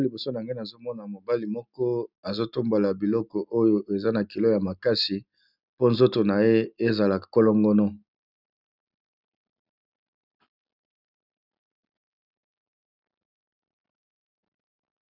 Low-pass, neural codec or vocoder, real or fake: 5.4 kHz; none; real